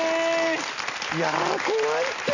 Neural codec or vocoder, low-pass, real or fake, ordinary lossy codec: none; 7.2 kHz; real; none